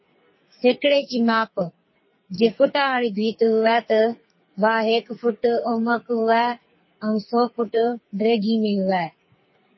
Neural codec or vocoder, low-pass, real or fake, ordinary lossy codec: codec, 44.1 kHz, 2.6 kbps, SNAC; 7.2 kHz; fake; MP3, 24 kbps